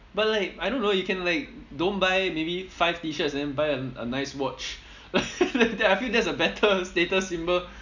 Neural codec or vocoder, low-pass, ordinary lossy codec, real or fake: none; 7.2 kHz; none; real